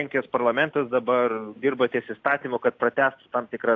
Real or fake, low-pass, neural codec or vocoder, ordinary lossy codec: real; 7.2 kHz; none; AAC, 48 kbps